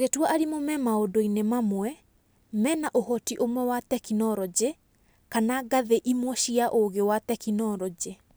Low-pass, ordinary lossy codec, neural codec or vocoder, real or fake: none; none; none; real